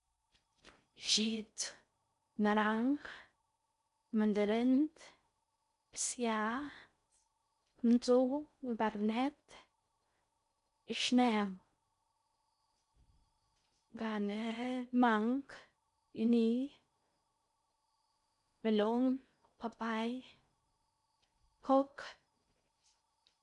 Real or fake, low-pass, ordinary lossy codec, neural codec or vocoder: fake; 10.8 kHz; MP3, 96 kbps; codec, 16 kHz in and 24 kHz out, 0.6 kbps, FocalCodec, streaming, 4096 codes